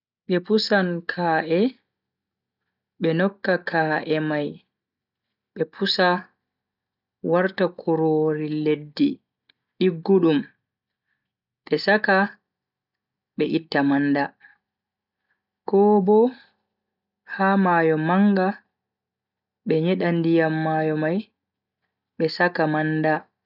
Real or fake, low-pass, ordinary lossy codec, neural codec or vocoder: real; 5.4 kHz; none; none